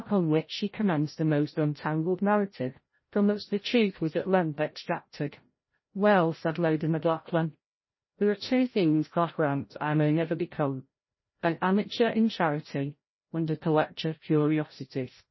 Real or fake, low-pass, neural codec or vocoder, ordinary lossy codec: fake; 7.2 kHz; codec, 16 kHz, 0.5 kbps, FreqCodec, larger model; MP3, 24 kbps